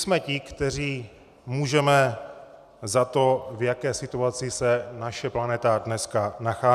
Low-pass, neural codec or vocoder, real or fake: 14.4 kHz; none; real